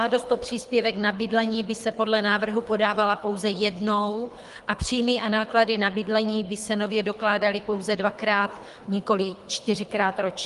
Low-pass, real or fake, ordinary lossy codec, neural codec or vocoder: 10.8 kHz; fake; Opus, 32 kbps; codec, 24 kHz, 3 kbps, HILCodec